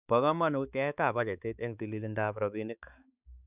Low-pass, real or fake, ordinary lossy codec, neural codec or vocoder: 3.6 kHz; fake; none; codec, 16 kHz, 4 kbps, X-Codec, HuBERT features, trained on balanced general audio